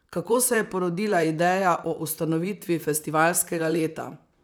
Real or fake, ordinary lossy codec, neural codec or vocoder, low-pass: fake; none; vocoder, 44.1 kHz, 128 mel bands, Pupu-Vocoder; none